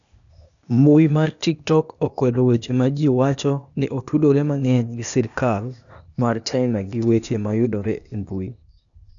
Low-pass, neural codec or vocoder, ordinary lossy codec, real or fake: 7.2 kHz; codec, 16 kHz, 0.8 kbps, ZipCodec; none; fake